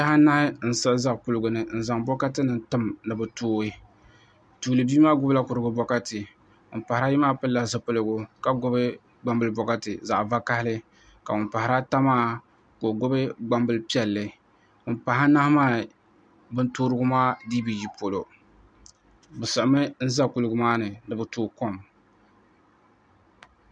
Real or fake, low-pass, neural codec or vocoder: real; 9.9 kHz; none